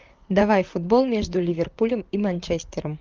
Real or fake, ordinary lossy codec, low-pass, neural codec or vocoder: fake; Opus, 24 kbps; 7.2 kHz; vocoder, 44.1 kHz, 128 mel bands, Pupu-Vocoder